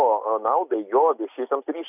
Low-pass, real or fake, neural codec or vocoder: 3.6 kHz; real; none